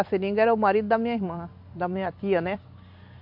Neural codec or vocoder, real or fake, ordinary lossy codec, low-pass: vocoder, 44.1 kHz, 128 mel bands every 256 samples, BigVGAN v2; fake; AAC, 48 kbps; 5.4 kHz